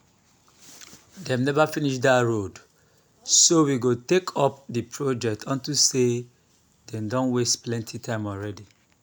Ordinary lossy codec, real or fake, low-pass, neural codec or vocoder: none; real; none; none